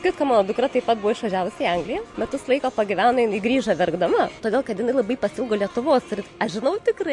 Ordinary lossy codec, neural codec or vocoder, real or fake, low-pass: MP3, 48 kbps; none; real; 10.8 kHz